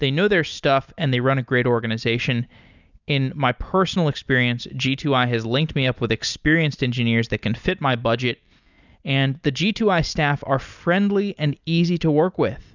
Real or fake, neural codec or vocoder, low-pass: real; none; 7.2 kHz